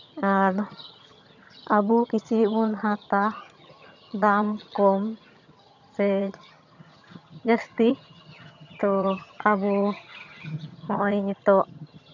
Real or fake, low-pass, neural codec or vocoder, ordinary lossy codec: fake; 7.2 kHz; vocoder, 22.05 kHz, 80 mel bands, HiFi-GAN; none